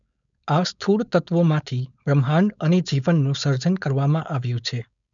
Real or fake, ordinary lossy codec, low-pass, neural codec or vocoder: fake; none; 7.2 kHz; codec, 16 kHz, 4.8 kbps, FACodec